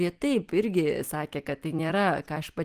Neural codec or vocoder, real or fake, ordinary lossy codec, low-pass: vocoder, 44.1 kHz, 128 mel bands every 256 samples, BigVGAN v2; fake; Opus, 24 kbps; 14.4 kHz